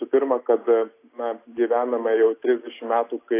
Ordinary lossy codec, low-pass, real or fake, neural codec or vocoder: AAC, 24 kbps; 3.6 kHz; real; none